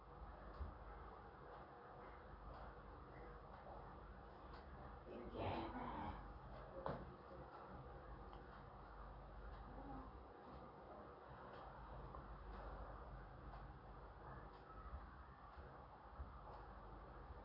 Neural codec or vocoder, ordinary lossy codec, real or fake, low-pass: none; none; real; 5.4 kHz